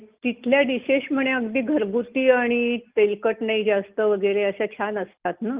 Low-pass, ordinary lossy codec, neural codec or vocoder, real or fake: 3.6 kHz; Opus, 32 kbps; none; real